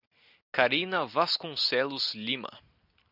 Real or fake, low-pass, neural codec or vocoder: real; 5.4 kHz; none